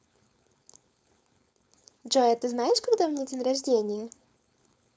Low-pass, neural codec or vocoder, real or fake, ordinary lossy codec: none; codec, 16 kHz, 4.8 kbps, FACodec; fake; none